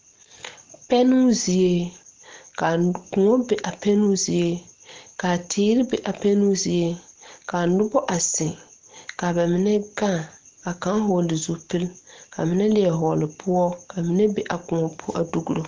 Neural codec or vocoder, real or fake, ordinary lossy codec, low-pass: none; real; Opus, 16 kbps; 7.2 kHz